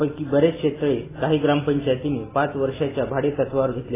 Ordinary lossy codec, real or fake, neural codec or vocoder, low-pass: AAC, 16 kbps; real; none; 3.6 kHz